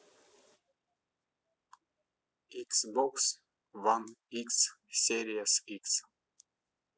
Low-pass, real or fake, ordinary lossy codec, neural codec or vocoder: none; real; none; none